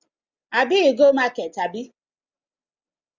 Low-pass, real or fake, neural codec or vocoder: 7.2 kHz; real; none